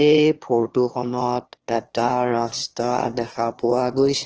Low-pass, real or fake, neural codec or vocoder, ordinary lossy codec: 7.2 kHz; fake; autoencoder, 22.05 kHz, a latent of 192 numbers a frame, VITS, trained on one speaker; Opus, 16 kbps